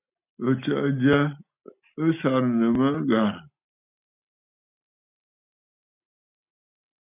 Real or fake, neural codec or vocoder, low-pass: real; none; 3.6 kHz